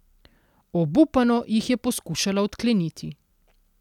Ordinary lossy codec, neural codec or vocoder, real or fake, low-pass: none; none; real; 19.8 kHz